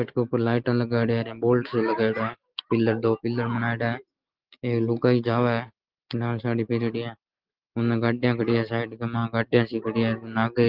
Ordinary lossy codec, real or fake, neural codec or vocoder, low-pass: Opus, 16 kbps; real; none; 5.4 kHz